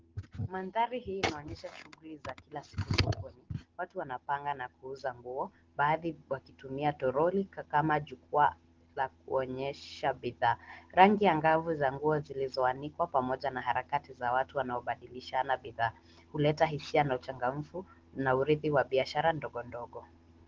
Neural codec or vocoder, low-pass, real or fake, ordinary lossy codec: none; 7.2 kHz; real; Opus, 16 kbps